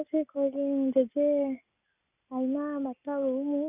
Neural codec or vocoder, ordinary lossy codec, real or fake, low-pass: none; none; real; 3.6 kHz